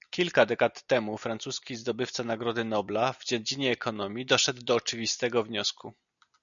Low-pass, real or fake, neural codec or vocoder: 7.2 kHz; real; none